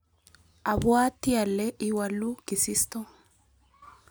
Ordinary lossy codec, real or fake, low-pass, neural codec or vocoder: none; real; none; none